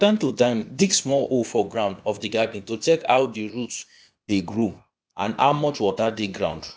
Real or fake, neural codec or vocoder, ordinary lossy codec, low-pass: fake; codec, 16 kHz, 0.8 kbps, ZipCodec; none; none